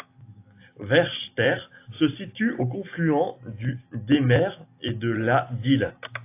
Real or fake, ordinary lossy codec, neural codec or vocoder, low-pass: real; AAC, 24 kbps; none; 3.6 kHz